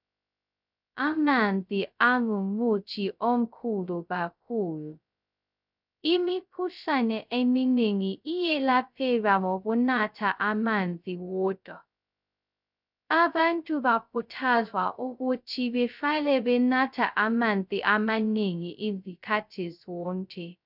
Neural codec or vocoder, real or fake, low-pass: codec, 16 kHz, 0.2 kbps, FocalCodec; fake; 5.4 kHz